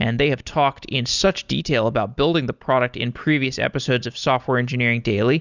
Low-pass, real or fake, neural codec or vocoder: 7.2 kHz; real; none